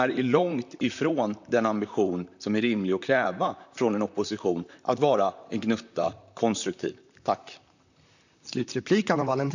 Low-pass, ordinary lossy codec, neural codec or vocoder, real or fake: 7.2 kHz; none; vocoder, 44.1 kHz, 128 mel bands, Pupu-Vocoder; fake